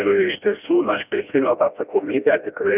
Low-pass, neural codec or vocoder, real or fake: 3.6 kHz; codec, 16 kHz, 1 kbps, FreqCodec, smaller model; fake